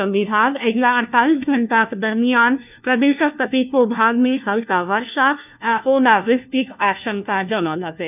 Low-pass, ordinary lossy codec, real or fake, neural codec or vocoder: 3.6 kHz; none; fake; codec, 16 kHz, 1 kbps, FunCodec, trained on LibriTTS, 50 frames a second